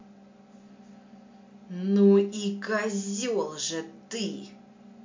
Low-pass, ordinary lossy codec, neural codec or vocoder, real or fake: 7.2 kHz; MP3, 48 kbps; none; real